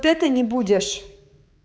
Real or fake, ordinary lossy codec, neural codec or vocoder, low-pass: fake; none; codec, 16 kHz, 4 kbps, X-Codec, HuBERT features, trained on balanced general audio; none